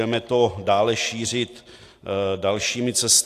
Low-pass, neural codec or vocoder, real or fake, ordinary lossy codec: 14.4 kHz; none; real; AAC, 64 kbps